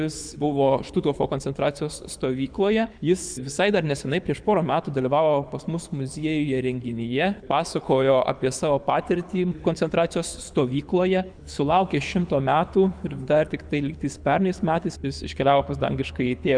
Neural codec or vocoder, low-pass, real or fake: codec, 24 kHz, 6 kbps, HILCodec; 9.9 kHz; fake